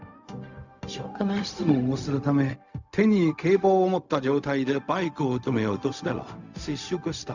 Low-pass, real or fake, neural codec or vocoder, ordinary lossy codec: 7.2 kHz; fake; codec, 16 kHz, 0.4 kbps, LongCat-Audio-Codec; none